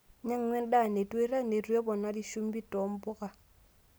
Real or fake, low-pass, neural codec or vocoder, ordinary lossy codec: real; none; none; none